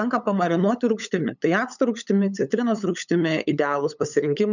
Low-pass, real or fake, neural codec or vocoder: 7.2 kHz; fake; codec, 16 kHz, 8 kbps, FunCodec, trained on LibriTTS, 25 frames a second